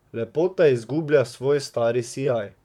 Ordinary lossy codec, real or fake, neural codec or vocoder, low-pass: MP3, 96 kbps; fake; vocoder, 44.1 kHz, 128 mel bands, Pupu-Vocoder; 19.8 kHz